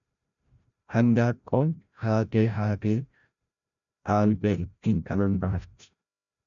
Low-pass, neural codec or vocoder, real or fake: 7.2 kHz; codec, 16 kHz, 0.5 kbps, FreqCodec, larger model; fake